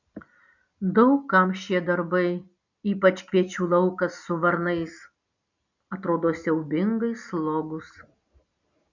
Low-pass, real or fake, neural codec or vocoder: 7.2 kHz; real; none